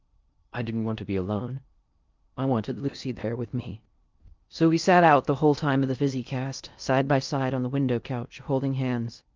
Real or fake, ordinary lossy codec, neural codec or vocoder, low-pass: fake; Opus, 24 kbps; codec, 16 kHz in and 24 kHz out, 0.6 kbps, FocalCodec, streaming, 2048 codes; 7.2 kHz